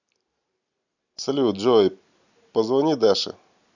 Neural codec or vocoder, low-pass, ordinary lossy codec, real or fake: none; 7.2 kHz; none; real